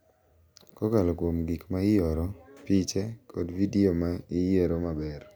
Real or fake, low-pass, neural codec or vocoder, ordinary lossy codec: real; none; none; none